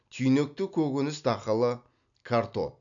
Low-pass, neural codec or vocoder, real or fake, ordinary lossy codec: 7.2 kHz; none; real; none